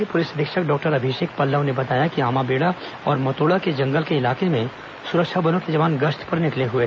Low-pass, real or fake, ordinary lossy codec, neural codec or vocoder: none; real; none; none